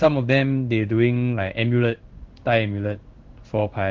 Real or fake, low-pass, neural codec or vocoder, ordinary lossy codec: fake; 7.2 kHz; codec, 16 kHz in and 24 kHz out, 1 kbps, XY-Tokenizer; Opus, 16 kbps